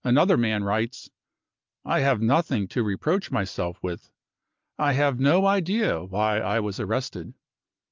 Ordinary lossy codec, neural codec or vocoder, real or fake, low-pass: Opus, 24 kbps; none; real; 7.2 kHz